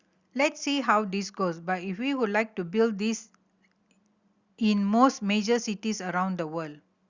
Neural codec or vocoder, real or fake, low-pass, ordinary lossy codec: none; real; 7.2 kHz; Opus, 64 kbps